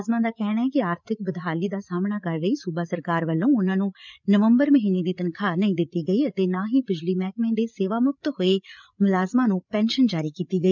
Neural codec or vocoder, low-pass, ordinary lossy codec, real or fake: codec, 16 kHz, 8 kbps, FreqCodec, larger model; 7.2 kHz; none; fake